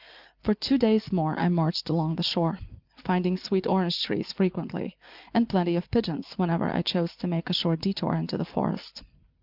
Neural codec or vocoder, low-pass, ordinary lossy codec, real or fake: none; 5.4 kHz; Opus, 24 kbps; real